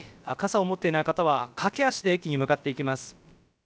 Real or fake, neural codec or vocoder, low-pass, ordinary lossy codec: fake; codec, 16 kHz, about 1 kbps, DyCAST, with the encoder's durations; none; none